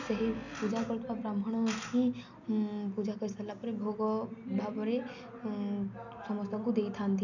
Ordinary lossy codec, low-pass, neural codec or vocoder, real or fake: none; 7.2 kHz; none; real